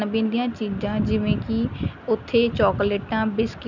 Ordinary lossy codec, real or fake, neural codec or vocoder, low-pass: none; real; none; 7.2 kHz